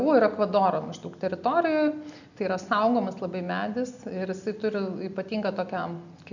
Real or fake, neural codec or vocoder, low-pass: real; none; 7.2 kHz